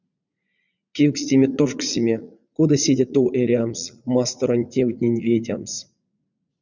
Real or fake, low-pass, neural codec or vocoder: fake; 7.2 kHz; vocoder, 44.1 kHz, 80 mel bands, Vocos